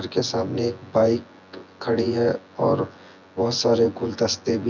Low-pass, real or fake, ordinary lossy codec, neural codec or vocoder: 7.2 kHz; fake; none; vocoder, 24 kHz, 100 mel bands, Vocos